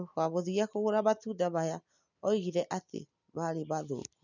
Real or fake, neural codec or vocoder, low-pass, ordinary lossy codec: real; none; 7.2 kHz; none